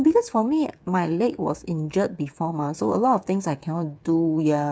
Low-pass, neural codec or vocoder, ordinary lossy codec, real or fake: none; codec, 16 kHz, 8 kbps, FreqCodec, smaller model; none; fake